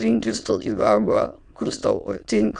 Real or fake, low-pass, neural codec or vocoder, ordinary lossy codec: fake; 9.9 kHz; autoencoder, 22.05 kHz, a latent of 192 numbers a frame, VITS, trained on many speakers; Opus, 64 kbps